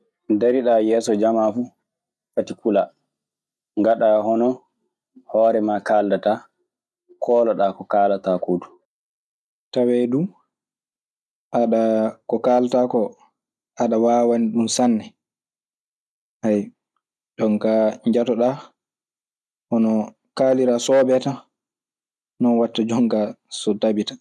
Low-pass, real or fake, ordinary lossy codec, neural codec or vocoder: none; real; none; none